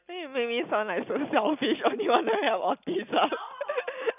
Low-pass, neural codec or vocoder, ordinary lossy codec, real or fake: 3.6 kHz; none; none; real